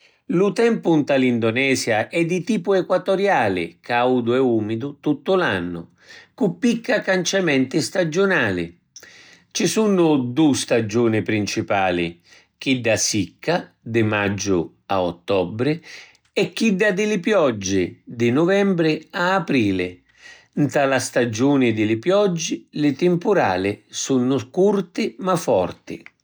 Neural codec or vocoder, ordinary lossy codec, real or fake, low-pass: none; none; real; none